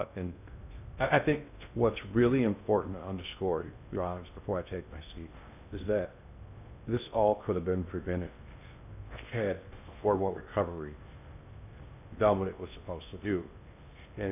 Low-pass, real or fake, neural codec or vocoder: 3.6 kHz; fake; codec, 16 kHz in and 24 kHz out, 0.6 kbps, FocalCodec, streaming, 2048 codes